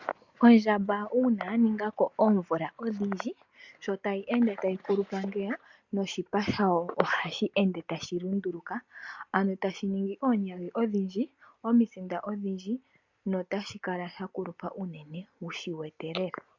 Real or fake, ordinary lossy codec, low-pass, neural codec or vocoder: real; MP3, 64 kbps; 7.2 kHz; none